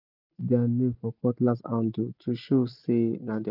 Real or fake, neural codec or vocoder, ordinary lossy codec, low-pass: real; none; none; 5.4 kHz